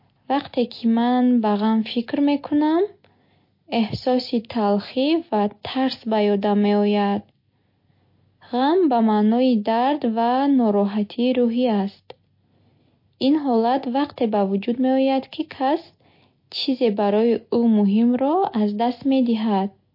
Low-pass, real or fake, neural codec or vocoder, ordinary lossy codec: 5.4 kHz; real; none; MP3, 32 kbps